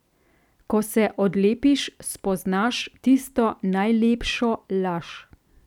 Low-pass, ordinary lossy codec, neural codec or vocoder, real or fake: 19.8 kHz; none; none; real